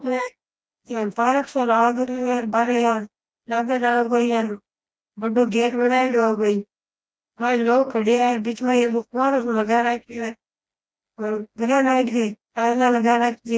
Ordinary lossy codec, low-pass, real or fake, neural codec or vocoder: none; none; fake; codec, 16 kHz, 1 kbps, FreqCodec, smaller model